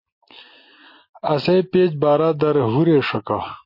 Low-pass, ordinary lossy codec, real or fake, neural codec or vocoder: 5.4 kHz; MP3, 32 kbps; real; none